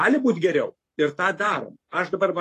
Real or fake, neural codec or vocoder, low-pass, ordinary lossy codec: fake; codec, 44.1 kHz, 7.8 kbps, Pupu-Codec; 14.4 kHz; AAC, 48 kbps